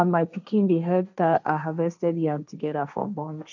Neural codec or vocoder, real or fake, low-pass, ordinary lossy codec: codec, 16 kHz, 1.1 kbps, Voila-Tokenizer; fake; 7.2 kHz; none